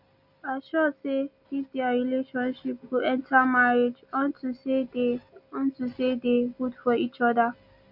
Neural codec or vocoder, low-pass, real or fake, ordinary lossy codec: none; 5.4 kHz; real; none